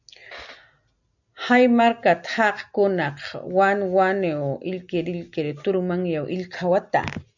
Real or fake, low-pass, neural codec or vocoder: real; 7.2 kHz; none